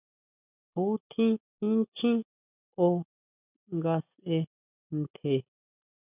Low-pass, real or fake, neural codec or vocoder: 3.6 kHz; real; none